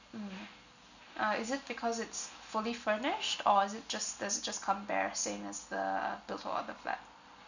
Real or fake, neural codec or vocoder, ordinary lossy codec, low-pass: real; none; none; 7.2 kHz